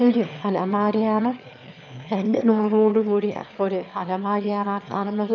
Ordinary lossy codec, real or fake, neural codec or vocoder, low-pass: none; fake; autoencoder, 22.05 kHz, a latent of 192 numbers a frame, VITS, trained on one speaker; 7.2 kHz